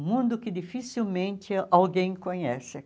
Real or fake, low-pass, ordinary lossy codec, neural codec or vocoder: real; none; none; none